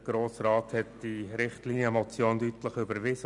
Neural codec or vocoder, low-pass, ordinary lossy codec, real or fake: none; 10.8 kHz; none; real